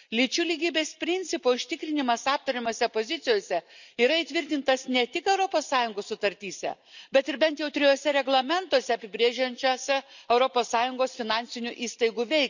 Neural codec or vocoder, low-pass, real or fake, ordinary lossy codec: none; 7.2 kHz; real; none